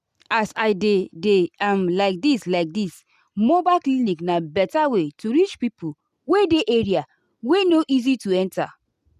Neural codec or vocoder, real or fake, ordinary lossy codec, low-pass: none; real; none; 14.4 kHz